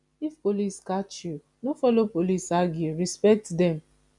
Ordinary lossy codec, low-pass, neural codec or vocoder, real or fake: none; 10.8 kHz; none; real